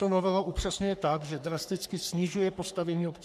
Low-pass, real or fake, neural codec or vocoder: 14.4 kHz; fake; codec, 44.1 kHz, 3.4 kbps, Pupu-Codec